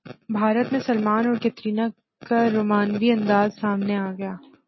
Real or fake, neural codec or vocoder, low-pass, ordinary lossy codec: real; none; 7.2 kHz; MP3, 24 kbps